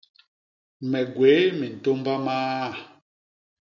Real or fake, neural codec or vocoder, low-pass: real; none; 7.2 kHz